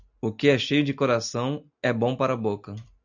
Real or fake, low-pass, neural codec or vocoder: real; 7.2 kHz; none